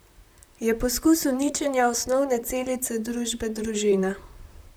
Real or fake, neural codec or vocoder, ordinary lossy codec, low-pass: fake; vocoder, 44.1 kHz, 128 mel bands, Pupu-Vocoder; none; none